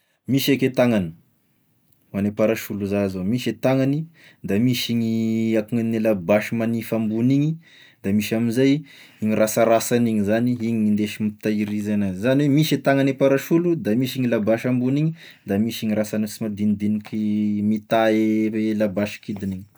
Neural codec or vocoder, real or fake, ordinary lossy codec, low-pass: none; real; none; none